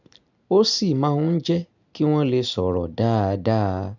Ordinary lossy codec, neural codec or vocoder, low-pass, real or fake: none; none; 7.2 kHz; real